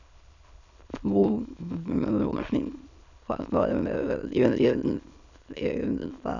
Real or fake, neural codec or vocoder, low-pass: fake; autoencoder, 22.05 kHz, a latent of 192 numbers a frame, VITS, trained on many speakers; 7.2 kHz